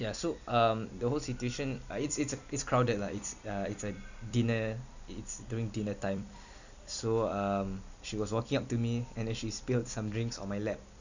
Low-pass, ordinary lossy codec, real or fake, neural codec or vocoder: 7.2 kHz; none; fake; vocoder, 44.1 kHz, 128 mel bands every 256 samples, BigVGAN v2